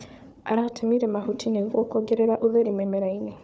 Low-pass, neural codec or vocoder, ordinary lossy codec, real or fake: none; codec, 16 kHz, 4 kbps, FunCodec, trained on Chinese and English, 50 frames a second; none; fake